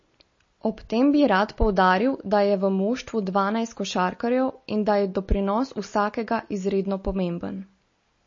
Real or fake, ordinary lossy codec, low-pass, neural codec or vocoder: real; MP3, 32 kbps; 7.2 kHz; none